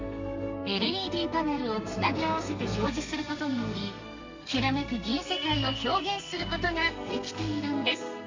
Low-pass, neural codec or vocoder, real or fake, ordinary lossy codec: 7.2 kHz; codec, 32 kHz, 1.9 kbps, SNAC; fake; MP3, 48 kbps